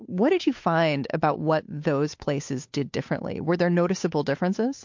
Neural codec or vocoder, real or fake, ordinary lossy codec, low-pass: codec, 16 kHz, 8 kbps, FunCodec, trained on Chinese and English, 25 frames a second; fake; MP3, 48 kbps; 7.2 kHz